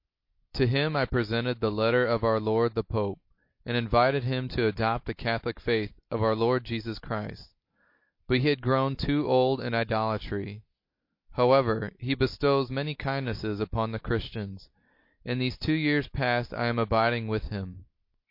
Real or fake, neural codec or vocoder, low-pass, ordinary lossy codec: real; none; 5.4 kHz; MP3, 32 kbps